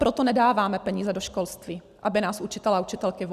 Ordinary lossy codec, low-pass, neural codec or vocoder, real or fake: Opus, 64 kbps; 14.4 kHz; none; real